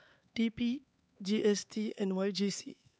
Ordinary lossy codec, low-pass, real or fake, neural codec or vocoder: none; none; fake; codec, 16 kHz, 4 kbps, X-Codec, HuBERT features, trained on LibriSpeech